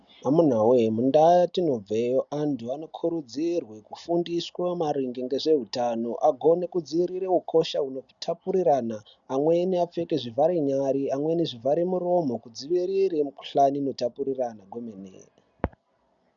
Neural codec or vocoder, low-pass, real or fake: none; 7.2 kHz; real